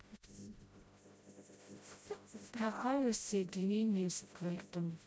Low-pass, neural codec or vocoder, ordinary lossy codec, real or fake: none; codec, 16 kHz, 0.5 kbps, FreqCodec, smaller model; none; fake